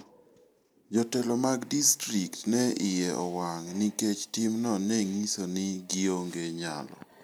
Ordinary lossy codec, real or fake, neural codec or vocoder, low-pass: none; real; none; none